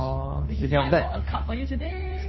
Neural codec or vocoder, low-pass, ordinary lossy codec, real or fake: codec, 16 kHz, 2 kbps, FunCodec, trained on Chinese and English, 25 frames a second; 7.2 kHz; MP3, 24 kbps; fake